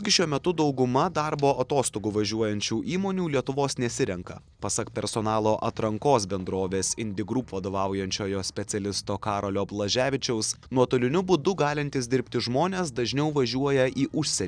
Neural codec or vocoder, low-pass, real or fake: none; 9.9 kHz; real